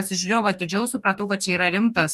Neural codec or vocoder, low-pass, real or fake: codec, 44.1 kHz, 2.6 kbps, DAC; 14.4 kHz; fake